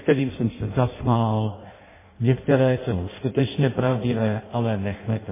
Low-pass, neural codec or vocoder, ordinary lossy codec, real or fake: 3.6 kHz; codec, 16 kHz in and 24 kHz out, 0.6 kbps, FireRedTTS-2 codec; MP3, 16 kbps; fake